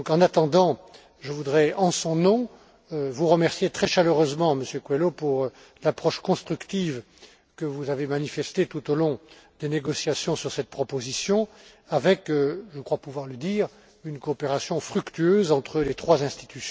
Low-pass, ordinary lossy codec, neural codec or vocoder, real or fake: none; none; none; real